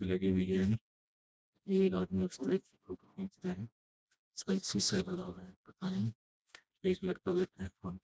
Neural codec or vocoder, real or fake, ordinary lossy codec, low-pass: codec, 16 kHz, 1 kbps, FreqCodec, smaller model; fake; none; none